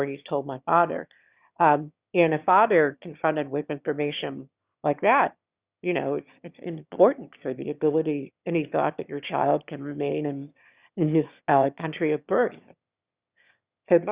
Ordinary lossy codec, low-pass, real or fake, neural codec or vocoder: Opus, 64 kbps; 3.6 kHz; fake; autoencoder, 22.05 kHz, a latent of 192 numbers a frame, VITS, trained on one speaker